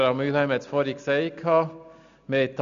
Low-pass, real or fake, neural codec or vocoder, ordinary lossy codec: 7.2 kHz; real; none; none